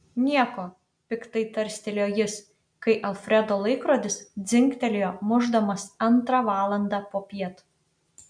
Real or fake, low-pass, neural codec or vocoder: real; 9.9 kHz; none